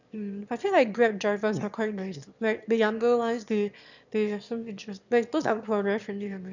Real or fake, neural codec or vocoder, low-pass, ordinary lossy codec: fake; autoencoder, 22.05 kHz, a latent of 192 numbers a frame, VITS, trained on one speaker; 7.2 kHz; none